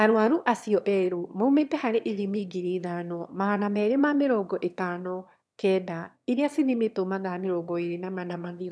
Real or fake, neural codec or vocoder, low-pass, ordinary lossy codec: fake; autoencoder, 22.05 kHz, a latent of 192 numbers a frame, VITS, trained on one speaker; none; none